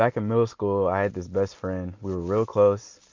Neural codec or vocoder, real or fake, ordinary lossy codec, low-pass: none; real; MP3, 64 kbps; 7.2 kHz